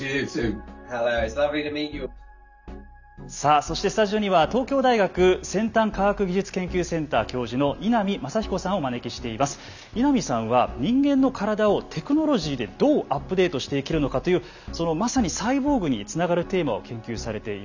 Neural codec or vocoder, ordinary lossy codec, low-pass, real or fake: none; none; 7.2 kHz; real